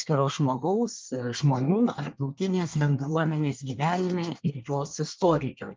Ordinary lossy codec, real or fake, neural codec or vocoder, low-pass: Opus, 24 kbps; fake; codec, 24 kHz, 1 kbps, SNAC; 7.2 kHz